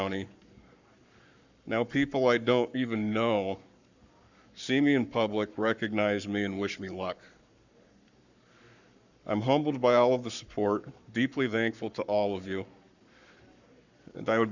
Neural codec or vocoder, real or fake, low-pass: codec, 44.1 kHz, 7.8 kbps, Pupu-Codec; fake; 7.2 kHz